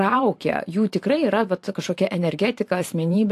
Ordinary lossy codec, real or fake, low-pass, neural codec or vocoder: AAC, 64 kbps; fake; 14.4 kHz; vocoder, 44.1 kHz, 128 mel bands every 512 samples, BigVGAN v2